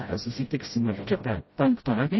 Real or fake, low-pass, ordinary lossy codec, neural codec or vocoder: fake; 7.2 kHz; MP3, 24 kbps; codec, 16 kHz, 0.5 kbps, FreqCodec, smaller model